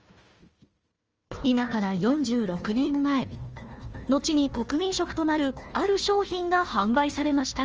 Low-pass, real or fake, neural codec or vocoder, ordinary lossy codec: 7.2 kHz; fake; codec, 16 kHz, 1 kbps, FunCodec, trained on Chinese and English, 50 frames a second; Opus, 24 kbps